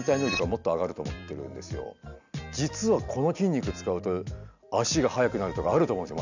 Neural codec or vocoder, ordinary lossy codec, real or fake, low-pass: none; none; real; 7.2 kHz